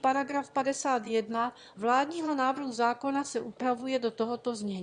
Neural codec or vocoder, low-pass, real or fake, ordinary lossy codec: autoencoder, 22.05 kHz, a latent of 192 numbers a frame, VITS, trained on one speaker; 9.9 kHz; fake; Opus, 64 kbps